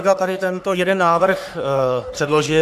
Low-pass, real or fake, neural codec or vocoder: 14.4 kHz; fake; codec, 44.1 kHz, 3.4 kbps, Pupu-Codec